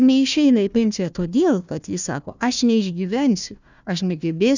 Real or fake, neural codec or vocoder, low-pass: fake; codec, 16 kHz, 1 kbps, FunCodec, trained on Chinese and English, 50 frames a second; 7.2 kHz